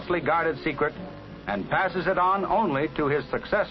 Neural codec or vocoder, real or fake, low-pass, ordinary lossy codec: none; real; 7.2 kHz; MP3, 24 kbps